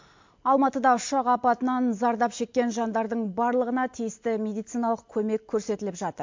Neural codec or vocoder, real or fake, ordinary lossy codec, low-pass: none; real; MP3, 48 kbps; 7.2 kHz